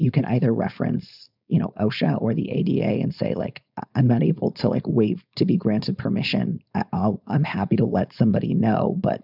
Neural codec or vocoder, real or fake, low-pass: codec, 16 kHz, 4.8 kbps, FACodec; fake; 5.4 kHz